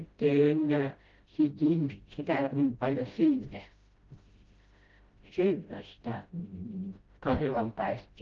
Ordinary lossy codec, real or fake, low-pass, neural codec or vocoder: Opus, 24 kbps; fake; 7.2 kHz; codec, 16 kHz, 0.5 kbps, FreqCodec, smaller model